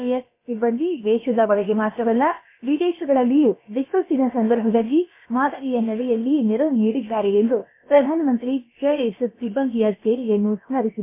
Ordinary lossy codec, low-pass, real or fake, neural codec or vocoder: MP3, 24 kbps; 3.6 kHz; fake; codec, 16 kHz, about 1 kbps, DyCAST, with the encoder's durations